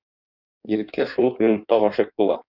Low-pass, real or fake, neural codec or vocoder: 5.4 kHz; fake; codec, 16 kHz in and 24 kHz out, 1.1 kbps, FireRedTTS-2 codec